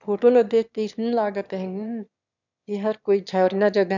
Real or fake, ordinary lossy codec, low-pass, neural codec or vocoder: fake; none; 7.2 kHz; autoencoder, 22.05 kHz, a latent of 192 numbers a frame, VITS, trained on one speaker